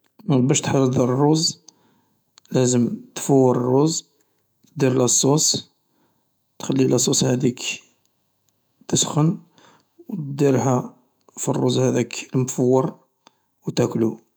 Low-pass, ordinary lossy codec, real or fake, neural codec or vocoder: none; none; fake; autoencoder, 48 kHz, 128 numbers a frame, DAC-VAE, trained on Japanese speech